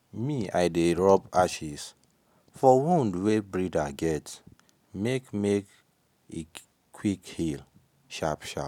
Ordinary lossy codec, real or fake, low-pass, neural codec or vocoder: none; real; 19.8 kHz; none